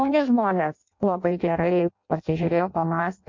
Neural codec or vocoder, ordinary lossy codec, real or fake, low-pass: codec, 16 kHz in and 24 kHz out, 0.6 kbps, FireRedTTS-2 codec; MP3, 48 kbps; fake; 7.2 kHz